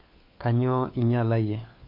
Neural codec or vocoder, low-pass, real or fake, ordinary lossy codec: codec, 16 kHz, 4 kbps, FunCodec, trained on LibriTTS, 50 frames a second; 5.4 kHz; fake; MP3, 32 kbps